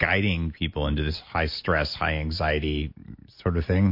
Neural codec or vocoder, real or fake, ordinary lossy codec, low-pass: none; real; MP3, 32 kbps; 5.4 kHz